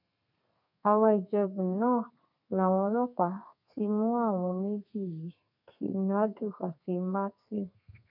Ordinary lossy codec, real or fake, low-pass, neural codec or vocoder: none; fake; 5.4 kHz; codec, 32 kHz, 1.9 kbps, SNAC